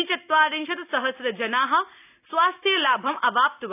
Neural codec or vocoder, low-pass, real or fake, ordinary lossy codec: none; 3.6 kHz; real; none